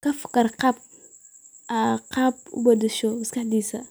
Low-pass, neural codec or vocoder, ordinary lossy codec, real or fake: none; none; none; real